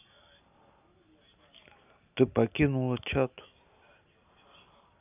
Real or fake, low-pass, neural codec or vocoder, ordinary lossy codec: real; 3.6 kHz; none; none